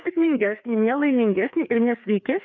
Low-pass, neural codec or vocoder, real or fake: 7.2 kHz; codec, 16 kHz, 2 kbps, FreqCodec, larger model; fake